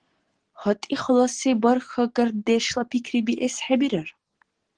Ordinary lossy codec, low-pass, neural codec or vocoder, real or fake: Opus, 16 kbps; 9.9 kHz; none; real